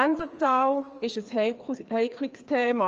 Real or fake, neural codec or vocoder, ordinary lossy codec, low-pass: fake; codec, 16 kHz, 2 kbps, FreqCodec, larger model; Opus, 24 kbps; 7.2 kHz